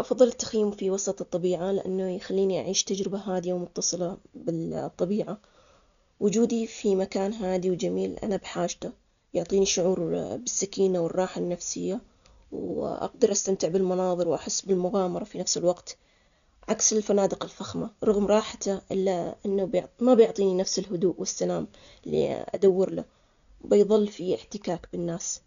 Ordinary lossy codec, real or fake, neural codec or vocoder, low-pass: MP3, 96 kbps; real; none; 7.2 kHz